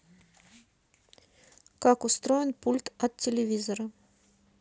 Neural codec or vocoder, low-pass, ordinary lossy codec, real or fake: none; none; none; real